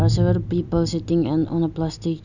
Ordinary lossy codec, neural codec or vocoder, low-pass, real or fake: none; none; 7.2 kHz; real